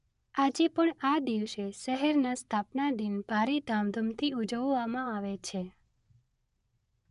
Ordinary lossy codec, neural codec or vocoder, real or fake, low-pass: none; vocoder, 24 kHz, 100 mel bands, Vocos; fake; 10.8 kHz